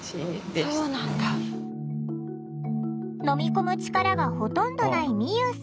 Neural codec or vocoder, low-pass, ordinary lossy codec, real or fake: none; none; none; real